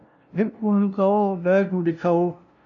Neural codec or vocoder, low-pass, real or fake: codec, 16 kHz, 0.5 kbps, FunCodec, trained on LibriTTS, 25 frames a second; 7.2 kHz; fake